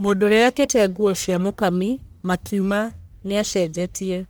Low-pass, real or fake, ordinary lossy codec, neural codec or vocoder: none; fake; none; codec, 44.1 kHz, 1.7 kbps, Pupu-Codec